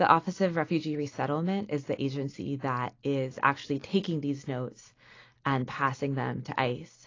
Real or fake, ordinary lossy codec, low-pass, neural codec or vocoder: real; AAC, 32 kbps; 7.2 kHz; none